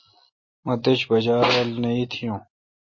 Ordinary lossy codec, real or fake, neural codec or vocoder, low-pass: MP3, 32 kbps; real; none; 7.2 kHz